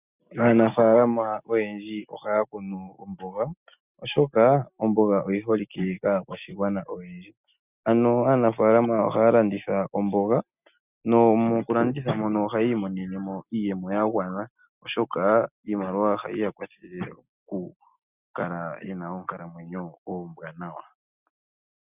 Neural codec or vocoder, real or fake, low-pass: none; real; 3.6 kHz